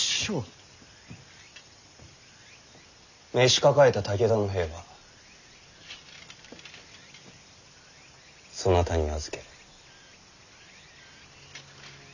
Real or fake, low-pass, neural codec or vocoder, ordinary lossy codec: real; 7.2 kHz; none; none